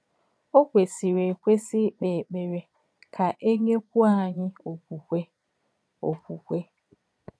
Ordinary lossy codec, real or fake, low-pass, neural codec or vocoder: none; fake; none; vocoder, 22.05 kHz, 80 mel bands, Vocos